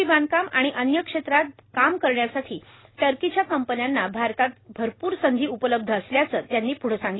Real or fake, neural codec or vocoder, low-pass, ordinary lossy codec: real; none; 7.2 kHz; AAC, 16 kbps